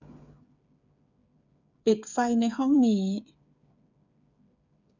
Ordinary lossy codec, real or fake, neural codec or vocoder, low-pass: none; fake; codec, 16 kHz, 8 kbps, FreqCodec, smaller model; 7.2 kHz